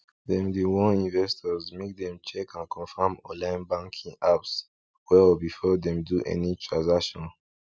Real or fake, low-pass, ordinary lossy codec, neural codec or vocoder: real; none; none; none